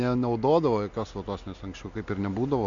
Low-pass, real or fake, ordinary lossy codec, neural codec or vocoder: 7.2 kHz; real; MP3, 64 kbps; none